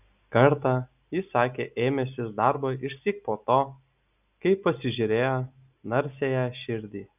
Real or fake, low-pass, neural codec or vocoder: real; 3.6 kHz; none